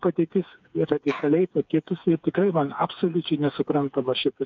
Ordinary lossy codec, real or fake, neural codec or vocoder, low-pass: MP3, 64 kbps; fake; codec, 16 kHz, 4 kbps, FreqCodec, smaller model; 7.2 kHz